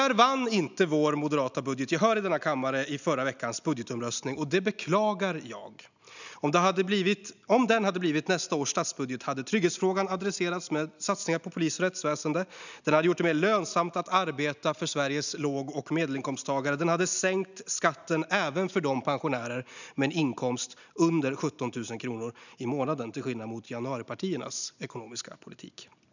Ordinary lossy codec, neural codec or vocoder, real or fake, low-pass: none; none; real; 7.2 kHz